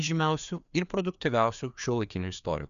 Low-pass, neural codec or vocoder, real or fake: 7.2 kHz; codec, 16 kHz, 2 kbps, FreqCodec, larger model; fake